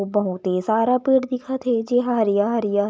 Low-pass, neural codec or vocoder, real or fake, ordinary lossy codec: none; none; real; none